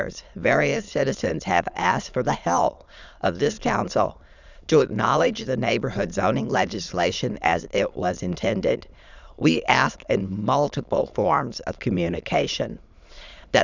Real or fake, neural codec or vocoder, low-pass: fake; autoencoder, 22.05 kHz, a latent of 192 numbers a frame, VITS, trained on many speakers; 7.2 kHz